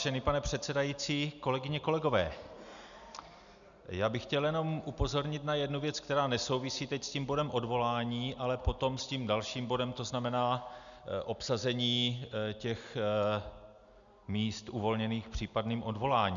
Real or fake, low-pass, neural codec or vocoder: real; 7.2 kHz; none